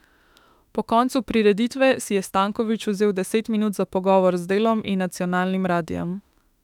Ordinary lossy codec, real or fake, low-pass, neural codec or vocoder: none; fake; 19.8 kHz; autoencoder, 48 kHz, 32 numbers a frame, DAC-VAE, trained on Japanese speech